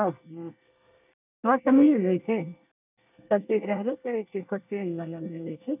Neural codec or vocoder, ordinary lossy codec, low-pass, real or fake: codec, 24 kHz, 1 kbps, SNAC; none; 3.6 kHz; fake